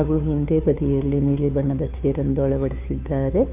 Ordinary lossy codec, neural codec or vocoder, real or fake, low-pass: none; codec, 16 kHz, 2 kbps, FunCodec, trained on Chinese and English, 25 frames a second; fake; 3.6 kHz